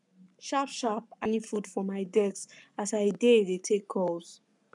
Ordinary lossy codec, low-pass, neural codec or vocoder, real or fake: none; 10.8 kHz; codec, 44.1 kHz, 7.8 kbps, Pupu-Codec; fake